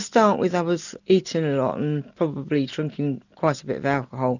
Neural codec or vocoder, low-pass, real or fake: none; 7.2 kHz; real